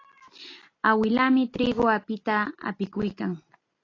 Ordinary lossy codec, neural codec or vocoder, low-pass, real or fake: AAC, 32 kbps; none; 7.2 kHz; real